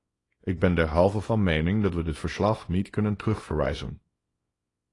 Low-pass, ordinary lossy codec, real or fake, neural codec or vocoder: 10.8 kHz; AAC, 32 kbps; fake; codec, 24 kHz, 0.9 kbps, WavTokenizer, small release